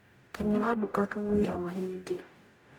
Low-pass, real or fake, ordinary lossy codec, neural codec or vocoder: 19.8 kHz; fake; none; codec, 44.1 kHz, 0.9 kbps, DAC